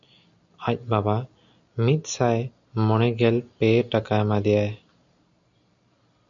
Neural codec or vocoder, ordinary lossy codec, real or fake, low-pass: none; MP3, 64 kbps; real; 7.2 kHz